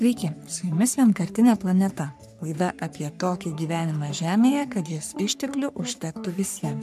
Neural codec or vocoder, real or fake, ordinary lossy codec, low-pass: codec, 44.1 kHz, 3.4 kbps, Pupu-Codec; fake; MP3, 96 kbps; 14.4 kHz